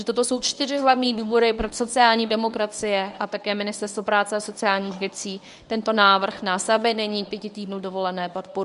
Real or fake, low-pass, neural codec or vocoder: fake; 10.8 kHz; codec, 24 kHz, 0.9 kbps, WavTokenizer, medium speech release version 1